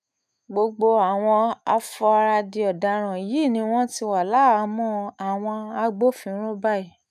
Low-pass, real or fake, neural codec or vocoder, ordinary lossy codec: 14.4 kHz; fake; autoencoder, 48 kHz, 128 numbers a frame, DAC-VAE, trained on Japanese speech; none